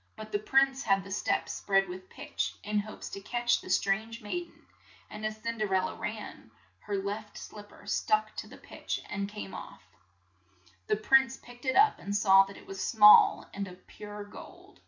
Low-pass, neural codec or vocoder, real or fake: 7.2 kHz; none; real